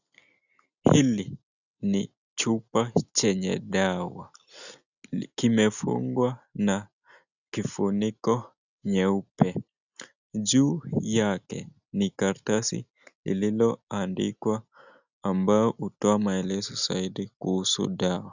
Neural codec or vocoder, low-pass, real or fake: none; 7.2 kHz; real